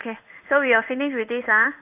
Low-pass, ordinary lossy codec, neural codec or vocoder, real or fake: 3.6 kHz; none; codec, 16 kHz in and 24 kHz out, 1 kbps, XY-Tokenizer; fake